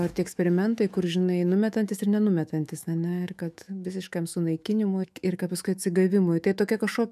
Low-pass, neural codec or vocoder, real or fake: 14.4 kHz; autoencoder, 48 kHz, 128 numbers a frame, DAC-VAE, trained on Japanese speech; fake